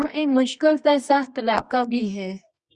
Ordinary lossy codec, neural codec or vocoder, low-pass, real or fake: Opus, 64 kbps; codec, 24 kHz, 0.9 kbps, WavTokenizer, medium music audio release; 10.8 kHz; fake